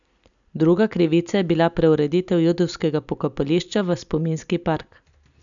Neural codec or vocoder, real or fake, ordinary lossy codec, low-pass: none; real; none; 7.2 kHz